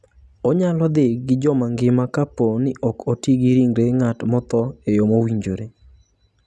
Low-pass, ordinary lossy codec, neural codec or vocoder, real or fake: none; none; none; real